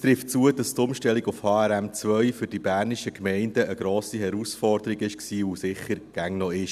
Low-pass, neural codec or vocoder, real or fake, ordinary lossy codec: 14.4 kHz; none; real; none